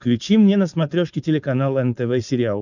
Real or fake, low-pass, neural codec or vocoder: fake; 7.2 kHz; codec, 16 kHz, 4.8 kbps, FACodec